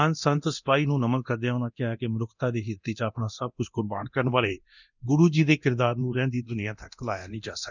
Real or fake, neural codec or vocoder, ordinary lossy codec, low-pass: fake; codec, 24 kHz, 0.9 kbps, DualCodec; none; 7.2 kHz